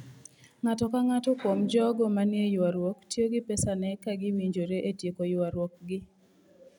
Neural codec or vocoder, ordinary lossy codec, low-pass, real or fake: none; none; 19.8 kHz; real